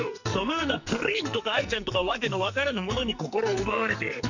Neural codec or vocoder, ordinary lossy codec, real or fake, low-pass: codec, 44.1 kHz, 2.6 kbps, SNAC; none; fake; 7.2 kHz